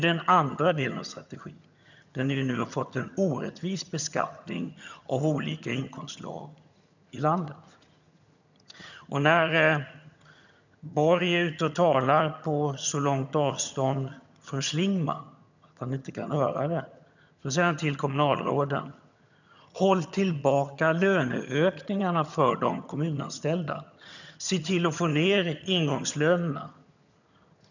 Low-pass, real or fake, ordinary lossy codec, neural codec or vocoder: 7.2 kHz; fake; none; vocoder, 22.05 kHz, 80 mel bands, HiFi-GAN